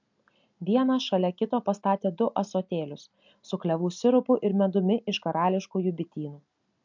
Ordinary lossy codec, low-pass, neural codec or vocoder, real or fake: MP3, 64 kbps; 7.2 kHz; none; real